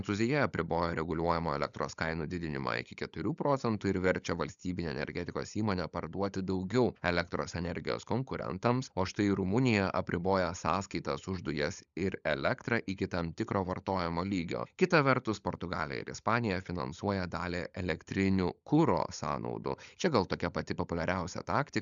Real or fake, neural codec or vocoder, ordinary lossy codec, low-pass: fake; codec, 16 kHz, 16 kbps, FunCodec, trained on LibriTTS, 50 frames a second; MP3, 96 kbps; 7.2 kHz